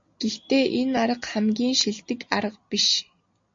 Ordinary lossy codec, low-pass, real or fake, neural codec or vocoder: MP3, 48 kbps; 7.2 kHz; real; none